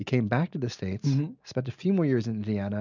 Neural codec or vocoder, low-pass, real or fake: none; 7.2 kHz; real